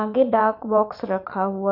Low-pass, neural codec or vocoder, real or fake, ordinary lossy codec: 5.4 kHz; vocoder, 22.05 kHz, 80 mel bands, Vocos; fake; MP3, 48 kbps